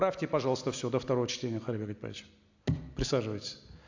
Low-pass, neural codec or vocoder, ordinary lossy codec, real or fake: 7.2 kHz; none; AAC, 48 kbps; real